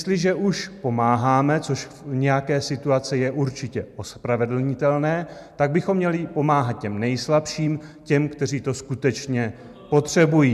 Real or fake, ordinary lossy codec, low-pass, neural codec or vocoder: real; MP3, 96 kbps; 14.4 kHz; none